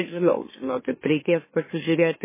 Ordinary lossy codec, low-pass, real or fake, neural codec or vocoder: MP3, 16 kbps; 3.6 kHz; fake; autoencoder, 44.1 kHz, a latent of 192 numbers a frame, MeloTTS